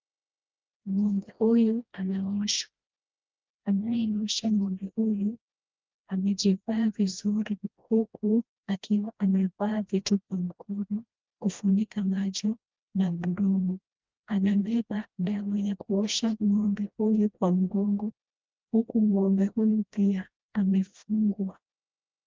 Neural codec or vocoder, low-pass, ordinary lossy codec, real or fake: codec, 16 kHz, 1 kbps, FreqCodec, smaller model; 7.2 kHz; Opus, 24 kbps; fake